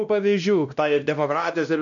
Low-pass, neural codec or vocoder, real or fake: 7.2 kHz; codec, 16 kHz, 1 kbps, X-Codec, WavLM features, trained on Multilingual LibriSpeech; fake